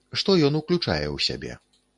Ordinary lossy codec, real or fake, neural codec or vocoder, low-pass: MP3, 64 kbps; real; none; 10.8 kHz